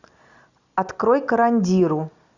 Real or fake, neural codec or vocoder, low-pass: real; none; 7.2 kHz